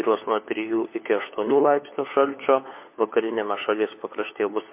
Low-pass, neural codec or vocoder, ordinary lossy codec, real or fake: 3.6 kHz; codec, 16 kHz, 4 kbps, FunCodec, trained on LibriTTS, 50 frames a second; MP3, 24 kbps; fake